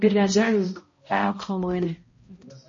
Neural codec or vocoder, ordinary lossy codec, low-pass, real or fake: codec, 16 kHz, 0.5 kbps, X-Codec, HuBERT features, trained on balanced general audio; MP3, 32 kbps; 7.2 kHz; fake